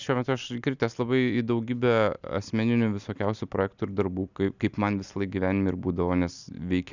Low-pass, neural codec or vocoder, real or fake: 7.2 kHz; none; real